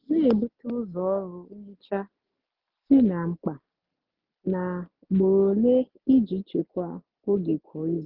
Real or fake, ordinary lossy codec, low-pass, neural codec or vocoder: real; Opus, 24 kbps; 5.4 kHz; none